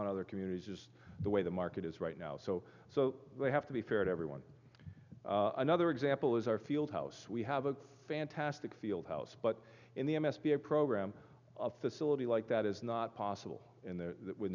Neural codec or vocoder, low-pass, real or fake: none; 7.2 kHz; real